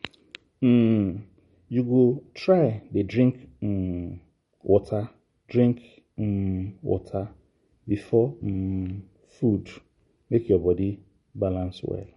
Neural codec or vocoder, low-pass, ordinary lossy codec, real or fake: none; 19.8 kHz; MP3, 48 kbps; real